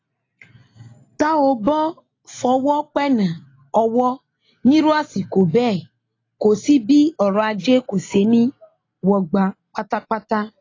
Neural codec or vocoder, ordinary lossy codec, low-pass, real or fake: none; AAC, 32 kbps; 7.2 kHz; real